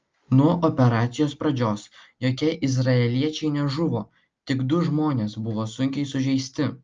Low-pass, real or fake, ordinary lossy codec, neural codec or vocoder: 7.2 kHz; real; Opus, 24 kbps; none